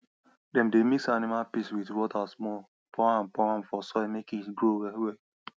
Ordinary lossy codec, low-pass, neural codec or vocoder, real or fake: none; none; none; real